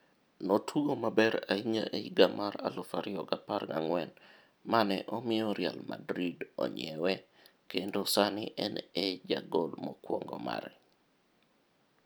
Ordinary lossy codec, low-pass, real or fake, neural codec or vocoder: none; none; real; none